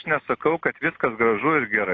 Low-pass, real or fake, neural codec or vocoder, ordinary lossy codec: 7.2 kHz; real; none; AAC, 32 kbps